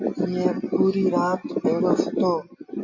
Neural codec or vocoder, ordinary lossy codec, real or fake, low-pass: none; AAC, 48 kbps; real; 7.2 kHz